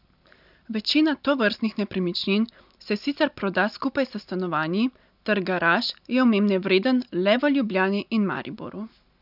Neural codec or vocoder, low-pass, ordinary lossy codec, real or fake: none; 5.4 kHz; none; real